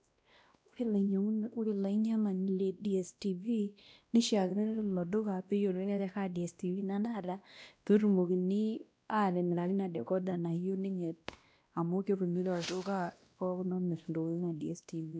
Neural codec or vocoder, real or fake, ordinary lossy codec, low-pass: codec, 16 kHz, 1 kbps, X-Codec, WavLM features, trained on Multilingual LibriSpeech; fake; none; none